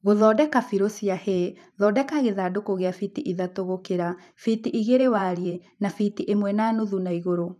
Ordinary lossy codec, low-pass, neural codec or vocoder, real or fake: none; 14.4 kHz; vocoder, 44.1 kHz, 128 mel bands every 512 samples, BigVGAN v2; fake